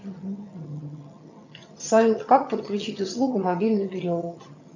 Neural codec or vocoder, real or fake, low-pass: vocoder, 22.05 kHz, 80 mel bands, HiFi-GAN; fake; 7.2 kHz